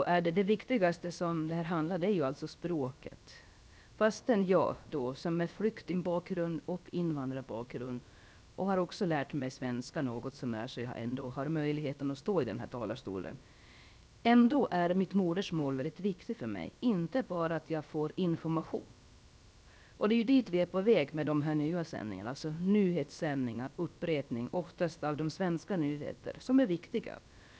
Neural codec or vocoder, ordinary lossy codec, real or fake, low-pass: codec, 16 kHz, about 1 kbps, DyCAST, with the encoder's durations; none; fake; none